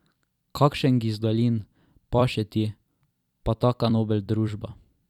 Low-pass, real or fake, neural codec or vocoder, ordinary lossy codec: 19.8 kHz; fake; vocoder, 44.1 kHz, 128 mel bands every 256 samples, BigVGAN v2; none